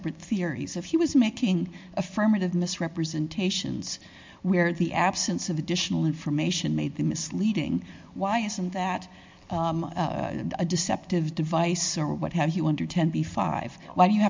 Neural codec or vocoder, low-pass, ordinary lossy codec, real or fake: none; 7.2 kHz; MP3, 64 kbps; real